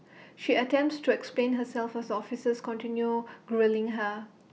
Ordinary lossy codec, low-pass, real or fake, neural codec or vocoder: none; none; real; none